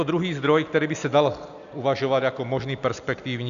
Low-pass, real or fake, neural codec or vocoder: 7.2 kHz; real; none